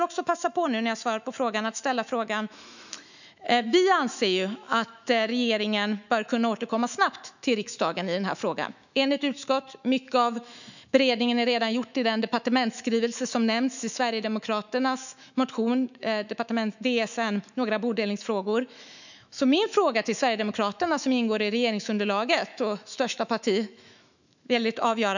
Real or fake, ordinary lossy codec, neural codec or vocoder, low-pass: fake; none; autoencoder, 48 kHz, 128 numbers a frame, DAC-VAE, trained on Japanese speech; 7.2 kHz